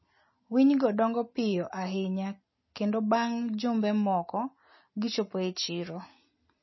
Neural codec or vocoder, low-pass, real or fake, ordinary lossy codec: none; 7.2 kHz; real; MP3, 24 kbps